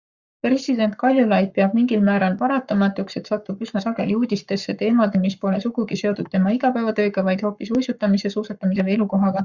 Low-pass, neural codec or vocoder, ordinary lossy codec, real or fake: 7.2 kHz; codec, 44.1 kHz, 7.8 kbps, Pupu-Codec; Opus, 64 kbps; fake